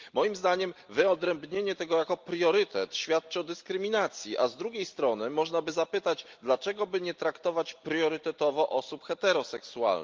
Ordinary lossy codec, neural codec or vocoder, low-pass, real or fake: Opus, 32 kbps; none; 7.2 kHz; real